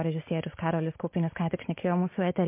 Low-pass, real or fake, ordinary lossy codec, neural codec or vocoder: 3.6 kHz; real; MP3, 24 kbps; none